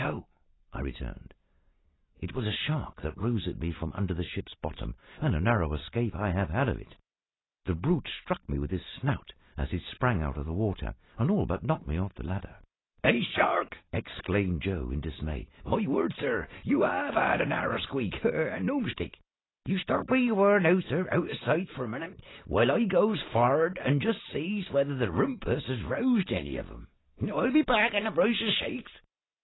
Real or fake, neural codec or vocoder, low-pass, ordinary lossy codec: real; none; 7.2 kHz; AAC, 16 kbps